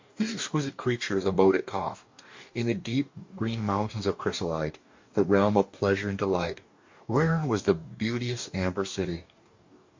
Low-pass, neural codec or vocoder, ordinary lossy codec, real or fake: 7.2 kHz; codec, 44.1 kHz, 2.6 kbps, DAC; MP3, 48 kbps; fake